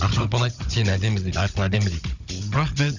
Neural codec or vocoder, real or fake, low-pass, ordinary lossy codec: codec, 16 kHz, 16 kbps, FunCodec, trained on Chinese and English, 50 frames a second; fake; 7.2 kHz; none